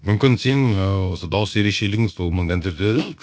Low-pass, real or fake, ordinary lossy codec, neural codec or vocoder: none; fake; none; codec, 16 kHz, about 1 kbps, DyCAST, with the encoder's durations